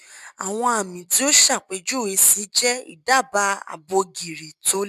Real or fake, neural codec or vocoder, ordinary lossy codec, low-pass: real; none; none; 14.4 kHz